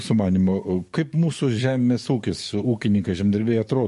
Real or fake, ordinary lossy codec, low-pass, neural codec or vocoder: fake; MP3, 48 kbps; 14.4 kHz; codec, 44.1 kHz, 7.8 kbps, DAC